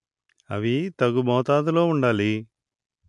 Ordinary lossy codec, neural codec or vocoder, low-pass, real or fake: MP3, 64 kbps; none; 10.8 kHz; real